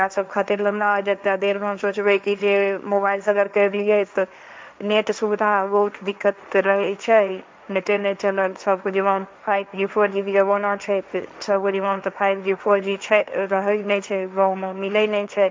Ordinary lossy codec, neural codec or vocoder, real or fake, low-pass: none; codec, 16 kHz, 1.1 kbps, Voila-Tokenizer; fake; none